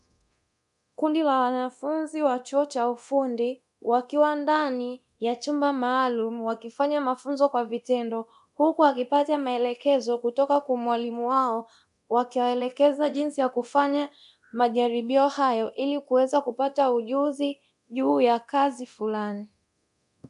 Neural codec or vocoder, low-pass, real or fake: codec, 24 kHz, 0.9 kbps, DualCodec; 10.8 kHz; fake